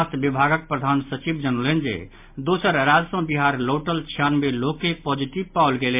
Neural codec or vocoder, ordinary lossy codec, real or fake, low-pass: none; MP3, 32 kbps; real; 3.6 kHz